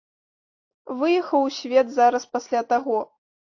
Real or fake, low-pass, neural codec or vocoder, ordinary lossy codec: real; 7.2 kHz; none; AAC, 48 kbps